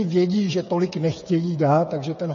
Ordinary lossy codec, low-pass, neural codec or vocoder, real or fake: MP3, 32 kbps; 7.2 kHz; codec, 16 kHz, 8 kbps, FreqCodec, smaller model; fake